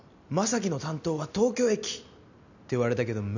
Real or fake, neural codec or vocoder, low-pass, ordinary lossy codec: real; none; 7.2 kHz; none